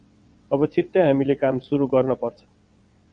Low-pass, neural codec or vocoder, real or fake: 9.9 kHz; vocoder, 22.05 kHz, 80 mel bands, WaveNeXt; fake